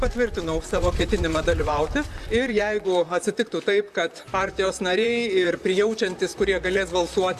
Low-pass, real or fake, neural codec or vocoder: 14.4 kHz; fake; vocoder, 44.1 kHz, 128 mel bands, Pupu-Vocoder